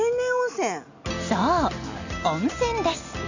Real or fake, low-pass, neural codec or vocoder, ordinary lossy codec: real; 7.2 kHz; none; none